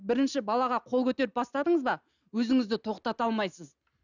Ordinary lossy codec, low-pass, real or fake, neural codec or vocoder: none; 7.2 kHz; real; none